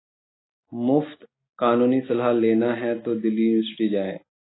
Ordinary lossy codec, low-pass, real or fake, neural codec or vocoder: AAC, 16 kbps; 7.2 kHz; real; none